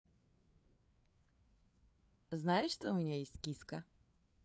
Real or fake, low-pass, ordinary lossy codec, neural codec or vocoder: fake; none; none; codec, 16 kHz, 4 kbps, FreqCodec, larger model